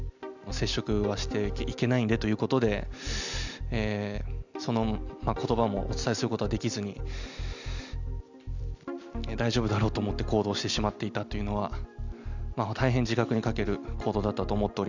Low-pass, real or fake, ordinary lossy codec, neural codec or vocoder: 7.2 kHz; real; none; none